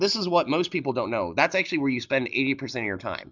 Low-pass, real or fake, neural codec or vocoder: 7.2 kHz; real; none